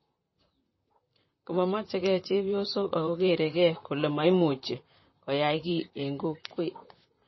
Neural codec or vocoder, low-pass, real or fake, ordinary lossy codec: vocoder, 44.1 kHz, 128 mel bands, Pupu-Vocoder; 7.2 kHz; fake; MP3, 24 kbps